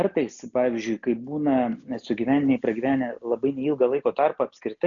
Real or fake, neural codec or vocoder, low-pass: real; none; 7.2 kHz